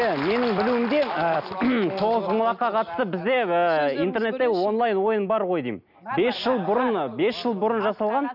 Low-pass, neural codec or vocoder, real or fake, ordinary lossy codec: 5.4 kHz; none; real; none